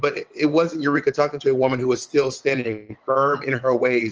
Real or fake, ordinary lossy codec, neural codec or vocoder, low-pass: fake; Opus, 24 kbps; vocoder, 22.05 kHz, 80 mel bands, WaveNeXt; 7.2 kHz